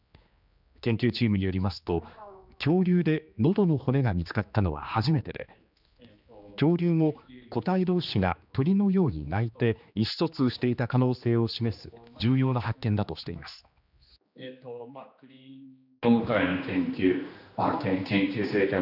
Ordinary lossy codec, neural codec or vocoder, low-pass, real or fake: none; codec, 16 kHz, 2 kbps, X-Codec, HuBERT features, trained on general audio; 5.4 kHz; fake